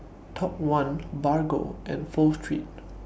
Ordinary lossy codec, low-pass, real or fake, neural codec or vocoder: none; none; real; none